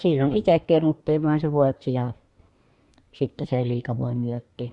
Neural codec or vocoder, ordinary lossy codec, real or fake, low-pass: codec, 24 kHz, 1 kbps, SNAC; Opus, 64 kbps; fake; 10.8 kHz